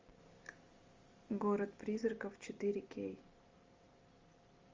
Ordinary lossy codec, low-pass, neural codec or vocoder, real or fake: Opus, 32 kbps; 7.2 kHz; none; real